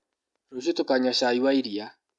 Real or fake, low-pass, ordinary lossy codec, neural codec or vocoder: real; 10.8 kHz; none; none